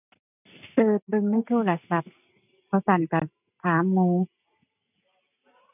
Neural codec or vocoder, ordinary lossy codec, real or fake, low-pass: none; none; real; 3.6 kHz